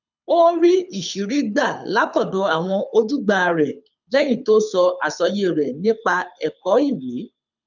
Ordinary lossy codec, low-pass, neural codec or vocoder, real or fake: none; 7.2 kHz; codec, 24 kHz, 6 kbps, HILCodec; fake